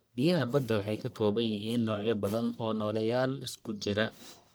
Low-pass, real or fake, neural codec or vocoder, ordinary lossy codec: none; fake; codec, 44.1 kHz, 1.7 kbps, Pupu-Codec; none